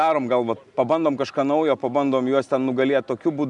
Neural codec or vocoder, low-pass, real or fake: none; 10.8 kHz; real